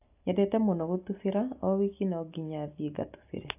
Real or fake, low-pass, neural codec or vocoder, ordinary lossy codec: real; 3.6 kHz; none; AAC, 32 kbps